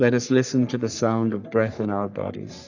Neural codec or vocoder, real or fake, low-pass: codec, 44.1 kHz, 3.4 kbps, Pupu-Codec; fake; 7.2 kHz